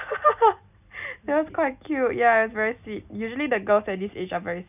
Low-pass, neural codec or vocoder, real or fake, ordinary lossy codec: 3.6 kHz; none; real; none